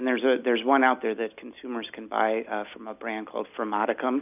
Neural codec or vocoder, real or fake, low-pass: none; real; 3.6 kHz